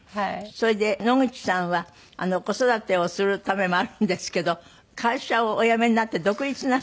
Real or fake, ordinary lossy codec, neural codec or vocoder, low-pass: real; none; none; none